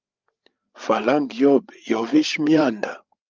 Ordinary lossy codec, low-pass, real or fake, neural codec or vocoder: Opus, 24 kbps; 7.2 kHz; fake; codec, 16 kHz, 8 kbps, FreqCodec, larger model